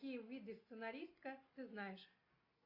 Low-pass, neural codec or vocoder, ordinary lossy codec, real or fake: 5.4 kHz; none; AAC, 48 kbps; real